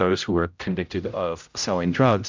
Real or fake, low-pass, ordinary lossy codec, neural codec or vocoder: fake; 7.2 kHz; MP3, 64 kbps; codec, 16 kHz, 0.5 kbps, X-Codec, HuBERT features, trained on general audio